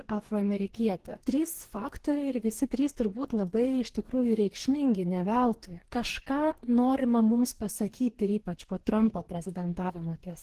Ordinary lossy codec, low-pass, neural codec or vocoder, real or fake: Opus, 16 kbps; 14.4 kHz; codec, 44.1 kHz, 2.6 kbps, DAC; fake